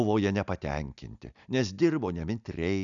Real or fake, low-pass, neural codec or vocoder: real; 7.2 kHz; none